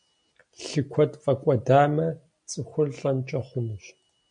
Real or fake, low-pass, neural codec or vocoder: real; 9.9 kHz; none